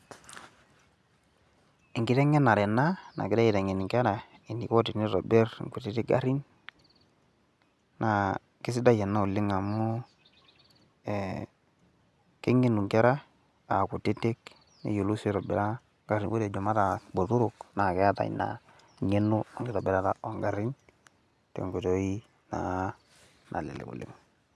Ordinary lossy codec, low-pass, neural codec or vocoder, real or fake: none; none; none; real